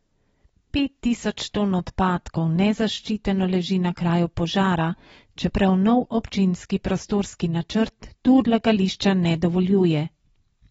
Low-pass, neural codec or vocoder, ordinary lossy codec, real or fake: 19.8 kHz; none; AAC, 24 kbps; real